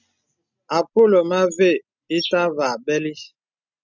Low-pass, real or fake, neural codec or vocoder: 7.2 kHz; real; none